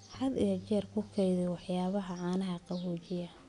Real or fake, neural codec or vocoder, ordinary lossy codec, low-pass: real; none; none; 10.8 kHz